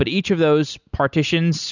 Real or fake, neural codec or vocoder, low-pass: real; none; 7.2 kHz